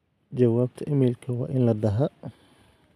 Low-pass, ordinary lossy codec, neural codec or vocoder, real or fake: 14.4 kHz; none; none; real